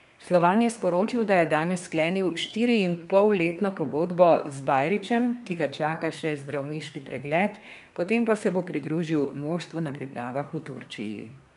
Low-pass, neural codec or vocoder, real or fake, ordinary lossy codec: 10.8 kHz; codec, 24 kHz, 1 kbps, SNAC; fake; none